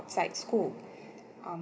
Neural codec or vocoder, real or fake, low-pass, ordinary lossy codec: none; real; none; none